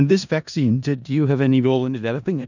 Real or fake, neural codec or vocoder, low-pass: fake; codec, 16 kHz in and 24 kHz out, 0.4 kbps, LongCat-Audio-Codec, four codebook decoder; 7.2 kHz